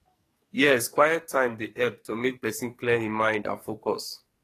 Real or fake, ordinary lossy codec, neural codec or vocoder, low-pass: fake; AAC, 48 kbps; codec, 44.1 kHz, 2.6 kbps, SNAC; 14.4 kHz